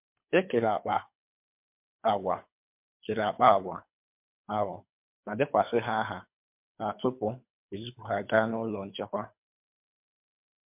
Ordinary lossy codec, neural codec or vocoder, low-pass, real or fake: MP3, 32 kbps; codec, 24 kHz, 3 kbps, HILCodec; 3.6 kHz; fake